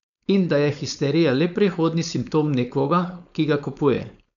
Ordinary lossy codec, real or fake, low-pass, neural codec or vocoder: none; fake; 7.2 kHz; codec, 16 kHz, 4.8 kbps, FACodec